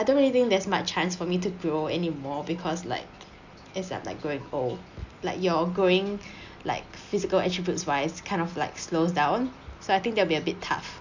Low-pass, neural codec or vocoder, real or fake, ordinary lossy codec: 7.2 kHz; none; real; none